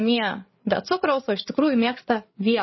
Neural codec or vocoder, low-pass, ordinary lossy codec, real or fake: codec, 16 kHz, 8 kbps, FreqCodec, larger model; 7.2 kHz; MP3, 24 kbps; fake